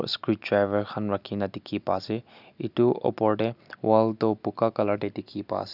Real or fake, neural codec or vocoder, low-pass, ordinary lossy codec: real; none; 5.4 kHz; none